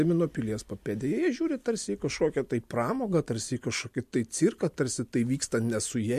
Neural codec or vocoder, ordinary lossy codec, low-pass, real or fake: none; MP3, 64 kbps; 14.4 kHz; real